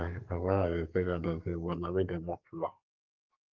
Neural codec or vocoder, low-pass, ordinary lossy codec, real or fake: codec, 44.1 kHz, 2.6 kbps, SNAC; 7.2 kHz; Opus, 24 kbps; fake